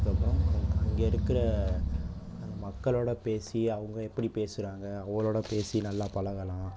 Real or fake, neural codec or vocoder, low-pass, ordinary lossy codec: real; none; none; none